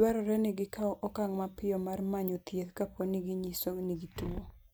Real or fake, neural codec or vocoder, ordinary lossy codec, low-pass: real; none; none; none